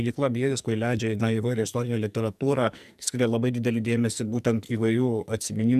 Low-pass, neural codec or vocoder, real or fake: 14.4 kHz; codec, 44.1 kHz, 2.6 kbps, SNAC; fake